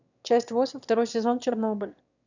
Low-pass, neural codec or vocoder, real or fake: 7.2 kHz; autoencoder, 22.05 kHz, a latent of 192 numbers a frame, VITS, trained on one speaker; fake